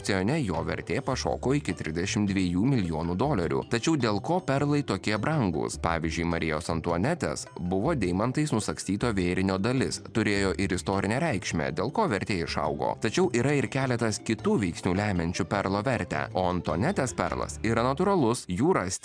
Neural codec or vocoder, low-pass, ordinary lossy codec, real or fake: none; 9.9 kHz; MP3, 96 kbps; real